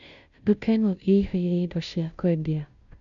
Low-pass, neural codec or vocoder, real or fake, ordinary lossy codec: 7.2 kHz; codec, 16 kHz, 0.5 kbps, FunCodec, trained on Chinese and English, 25 frames a second; fake; none